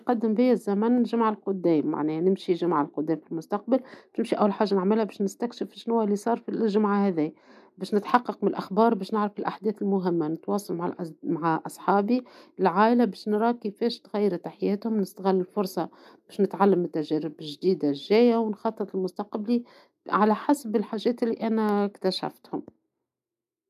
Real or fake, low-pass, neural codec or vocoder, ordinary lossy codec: real; 14.4 kHz; none; none